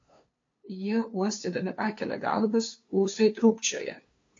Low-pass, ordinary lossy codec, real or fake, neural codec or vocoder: 7.2 kHz; AAC, 48 kbps; fake; codec, 16 kHz, 2 kbps, FunCodec, trained on LibriTTS, 25 frames a second